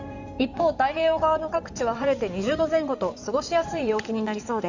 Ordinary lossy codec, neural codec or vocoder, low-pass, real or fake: none; codec, 16 kHz in and 24 kHz out, 2.2 kbps, FireRedTTS-2 codec; 7.2 kHz; fake